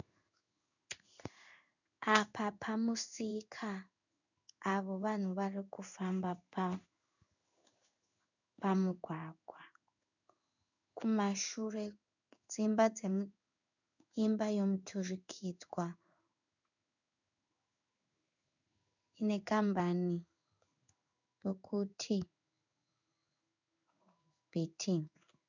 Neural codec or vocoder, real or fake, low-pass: codec, 16 kHz in and 24 kHz out, 1 kbps, XY-Tokenizer; fake; 7.2 kHz